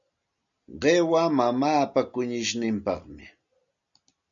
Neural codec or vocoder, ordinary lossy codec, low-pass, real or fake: none; MP3, 96 kbps; 7.2 kHz; real